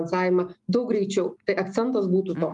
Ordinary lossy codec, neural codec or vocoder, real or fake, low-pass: Opus, 32 kbps; none; real; 10.8 kHz